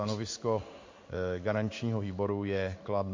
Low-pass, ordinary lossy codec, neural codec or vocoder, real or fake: 7.2 kHz; MP3, 48 kbps; codec, 16 kHz in and 24 kHz out, 1 kbps, XY-Tokenizer; fake